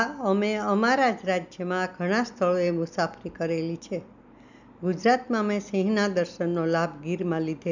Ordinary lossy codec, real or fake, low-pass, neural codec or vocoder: none; real; 7.2 kHz; none